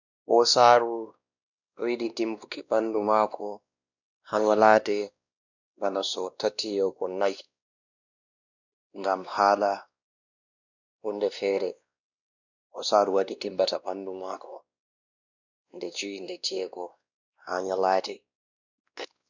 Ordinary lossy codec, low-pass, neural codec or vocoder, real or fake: none; 7.2 kHz; codec, 16 kHz, 1 kbps, X-Codec, WavLM features, trained on Multilingual LibriSpeech; fake